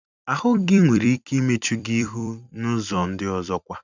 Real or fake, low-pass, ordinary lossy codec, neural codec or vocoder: fake; 7.2 kHz; none; vocoder, 44.1 kHz, 128 mel bands every 256 samples, BigVGAN v2